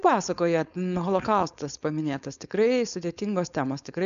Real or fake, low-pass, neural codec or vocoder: fake; 7.2 kHz; codec, 16 kHz, 4.8 kbps, FACodec